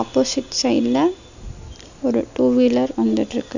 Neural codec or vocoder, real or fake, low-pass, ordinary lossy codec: none; real; 7.2 kHz; none